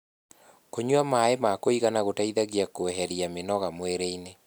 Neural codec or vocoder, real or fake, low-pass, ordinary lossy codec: none; real; none; none